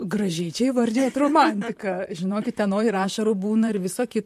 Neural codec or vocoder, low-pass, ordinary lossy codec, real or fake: vocoder, 44.1 kHz, 128 mel bands, Pupu-Vocoder; 14.4 kHz; MP3, 64 kbps; fake